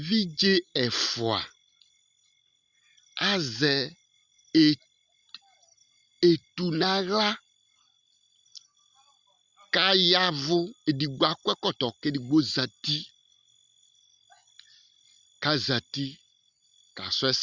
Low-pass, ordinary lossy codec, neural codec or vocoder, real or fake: 7.2 kHz; Opus, 64 kbps; none; real